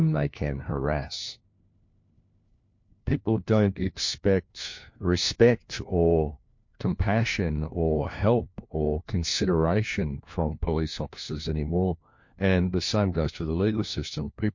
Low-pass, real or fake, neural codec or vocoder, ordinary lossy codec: 7.2 kHz; fake; codec, 16 kHz, 1 kbps, FunCodec, trained on LibriTTS, 50 frames a second; MP3, 48 kbps